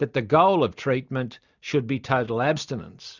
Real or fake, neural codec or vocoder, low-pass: real; none; 7.2 kHz